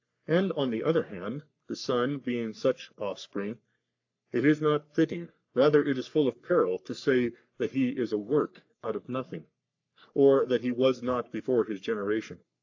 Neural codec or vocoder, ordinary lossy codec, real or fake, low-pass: codec, 44.1 kHz, 3.4 kbps, Pupu-Codec; AAC, 48 kbps; fake; 7.2 kHz